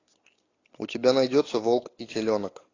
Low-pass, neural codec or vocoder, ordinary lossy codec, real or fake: 7.2 kHz; none; AAC, 32 kbps; real